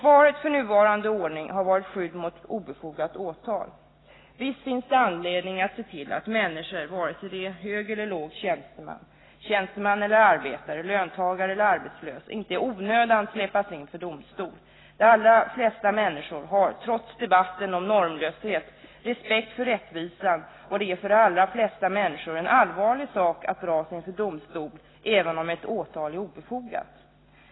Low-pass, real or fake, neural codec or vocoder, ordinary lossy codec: 7.2 kHz; real; none; AAC, 16 kbps